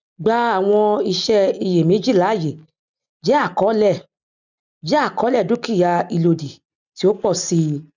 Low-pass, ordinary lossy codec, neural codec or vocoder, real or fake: 7.2 kHz; none; none; real